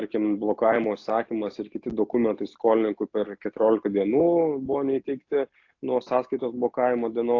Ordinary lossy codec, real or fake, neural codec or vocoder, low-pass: AAC, 48 kbps; real; none; 7.2 kHz